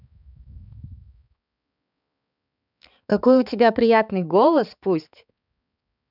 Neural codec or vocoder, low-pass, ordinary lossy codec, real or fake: codec, 16 kHz, 4 kbps, X-Codec, HuBERT features, trained on balanced general audio; 5.4 kHz; none; fake